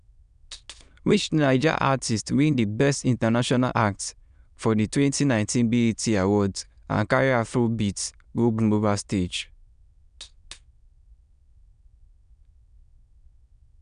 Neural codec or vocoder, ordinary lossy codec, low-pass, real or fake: autoencoder, 22.05 kHz, a latent of 192 numbers a frame, VITS, trained on many speakers; none; 9.9 kHz; fake